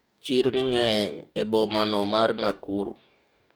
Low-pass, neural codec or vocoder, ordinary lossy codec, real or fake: none; codec, 44.1 kHz, 2.6 kbps, DAC; none; fake